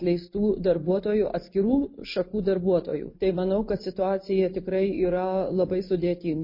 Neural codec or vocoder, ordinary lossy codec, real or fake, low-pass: none; MP3, 24 kbps; real; 5.4 kHz